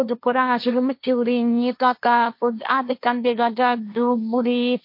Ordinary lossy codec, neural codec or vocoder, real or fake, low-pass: MP3, 32 kbps; codec, 16 kHz, 1.1 kbps, Voila-Tokenizer; fake; 5.4 kHz